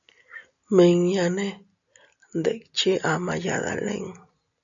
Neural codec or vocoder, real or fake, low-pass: none; real; 7.2 kHz